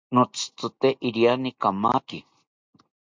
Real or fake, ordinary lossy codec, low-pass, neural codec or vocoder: real; MP3, 64 kbps; 7.2 kHz; none